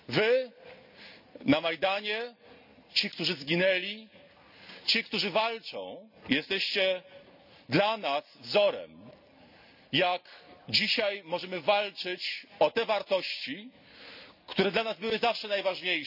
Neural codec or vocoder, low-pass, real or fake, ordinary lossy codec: none; 5.4 kHz; real; none